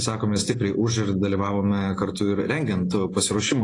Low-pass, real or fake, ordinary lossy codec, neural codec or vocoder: 10.8 kHz; real; AAC, 32 kbps; none